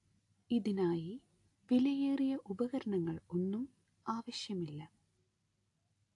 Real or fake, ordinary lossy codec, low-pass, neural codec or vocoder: real; AAC, 48 kbps; 10.8 kHz; none